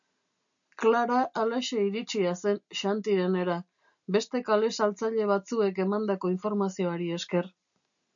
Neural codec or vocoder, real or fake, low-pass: none; real; 7.2 kHz